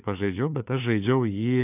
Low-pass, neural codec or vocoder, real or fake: 3.6 kHz; codec, 16 kHz, 4 kbps, FunCodec, trained on LibriTTS, 50 frames a second; fake